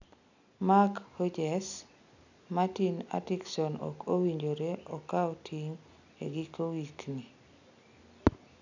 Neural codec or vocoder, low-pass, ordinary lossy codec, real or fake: none; 7.2 kHz; none; real